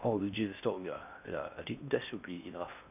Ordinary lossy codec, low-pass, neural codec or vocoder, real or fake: none; 3.6 kHz; codec, 16 kHz in and 24 kHz out, 0.6 kbps, FocalCodec, streaming, 4096 codes; fake